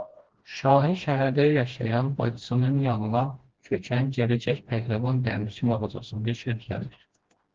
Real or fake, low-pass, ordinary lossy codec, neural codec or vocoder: fake; 7.2 kHz; Opus, 16 kbps; codec, 16 kHz, 1 kbps, FreqCodec, smaller model